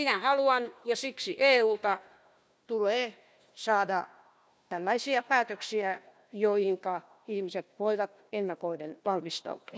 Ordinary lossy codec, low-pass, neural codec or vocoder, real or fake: none; none; codec, 16 kHz, 1 kbps, FunCodec, trained on Chinese and English, 50 frames a second; fake